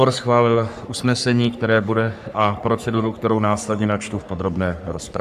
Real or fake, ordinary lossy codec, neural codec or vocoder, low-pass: fake; AAC, 96 kbps; codec, 44.1 kHz, 3.4 kbps, Pupu-Codec; 14.4 kHz